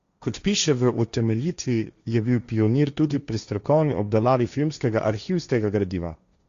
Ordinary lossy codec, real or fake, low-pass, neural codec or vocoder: Opus, 64 kbps; fake; 7.2 kHz; codec, 16 kHz, 1.1 kbps, Voila-Tokenizer